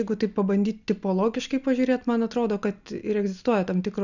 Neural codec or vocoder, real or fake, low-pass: none; real; 7.2 kHz